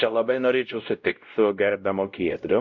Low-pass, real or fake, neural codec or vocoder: 7.2 kHz; fake; codec, 16 kHz, 0.5 kbps, X-Codec, WavLM features, trained on Multilingual LibriSpeech